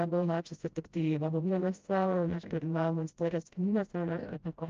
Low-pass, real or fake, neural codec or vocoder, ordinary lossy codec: 7.2 kHz; fake; codec, 16 kHz, 0.5 kbps, FreqCodec, smaller model; Opus, 24 kbps